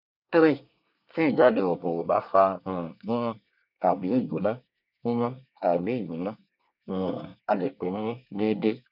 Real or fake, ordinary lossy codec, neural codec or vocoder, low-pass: fake; none; codec, 24 kHz, 1 kbps, SNAC; 5.4 kHz